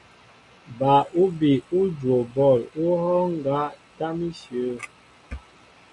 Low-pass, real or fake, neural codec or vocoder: 10.8 kHz; real; none